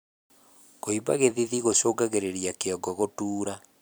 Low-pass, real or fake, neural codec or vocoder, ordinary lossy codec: none; fake; vocoder, 44.1 kHz, 128 mel bands every 256 samples, BigVGAN v2; none